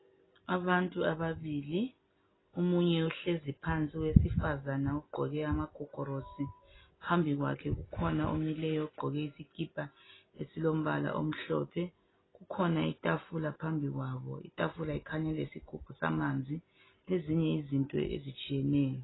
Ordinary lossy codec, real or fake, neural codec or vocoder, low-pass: AAC, 16 kbps; real; none; 7.2 kHz